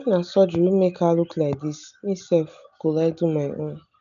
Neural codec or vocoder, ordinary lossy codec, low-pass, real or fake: none; none; 7.2 kHz; real